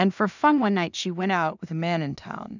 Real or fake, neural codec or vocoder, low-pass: fake; codec, 16 kHz in and 24 kHz out, 0.4 kbps, LongCat-Audio-Codec, two codebook decoder; 7.2 kHz